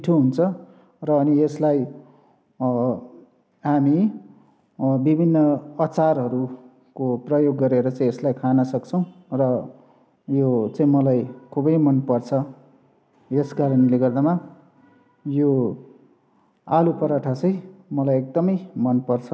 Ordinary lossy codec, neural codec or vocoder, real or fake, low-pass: none; none; real; none